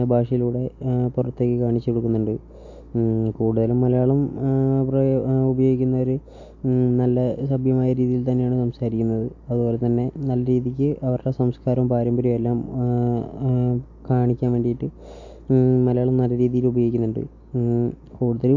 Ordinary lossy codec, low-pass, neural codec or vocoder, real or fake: AAC, 48 kbps; 7.2 kHz; none; real